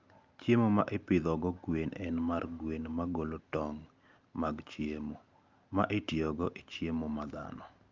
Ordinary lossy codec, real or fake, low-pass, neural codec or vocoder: Opus, 24 kbps; real; 7.2 kHz; none